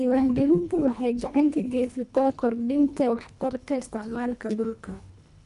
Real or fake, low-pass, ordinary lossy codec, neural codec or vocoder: fake; 10.8 kHz; none; codec, 24 kHz, 1.5 kbps, HILCodec